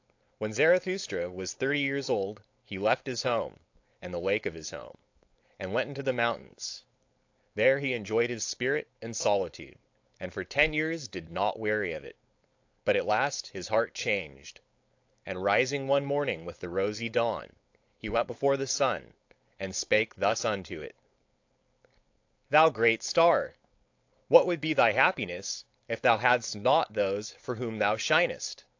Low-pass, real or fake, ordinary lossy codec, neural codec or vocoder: 7.2 kHz; fake; AAC, 48 kbps; codec, 16 kHz, 4.8 kbps, FACodec